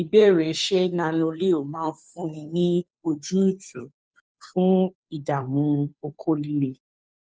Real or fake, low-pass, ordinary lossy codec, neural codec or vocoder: fake; none; none; codec, 16 kHz, 2 kbps, FunCodec, trained on Chinese and English, 25 frames a second